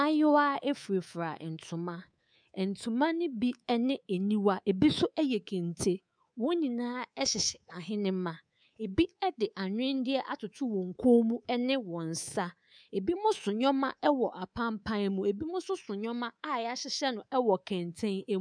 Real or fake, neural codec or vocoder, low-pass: fake; codec, 24 kHz, 3.1 kbps, DualCodec; 9.9 kHz